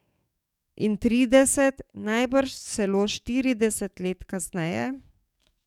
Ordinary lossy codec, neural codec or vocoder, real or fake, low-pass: none; codec, 44.1 kHz, 7.8 kbps, DAC; fake; 19.8 kHz